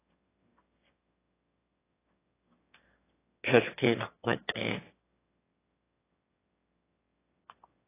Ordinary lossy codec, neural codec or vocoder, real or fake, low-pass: AAC, 16 kbps; autoencoder, 22.05 kHz, a latent of 192 numbers a frame, VITS, trained on one speaker; fake; 3.6 kHz